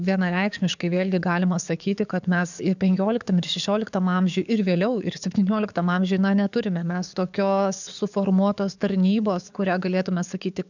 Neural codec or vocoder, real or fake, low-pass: codec, 44.1 kHz, 7.8 kbps, DAC; fake; 7.2 kHz